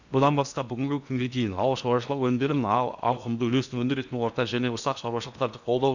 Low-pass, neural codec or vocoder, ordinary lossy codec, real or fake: 7.2 kHz; codec, 16 kHz in and 24 kHz out, 0.8 kbps, FocalCodec, streaming, 65536 codes; none; fake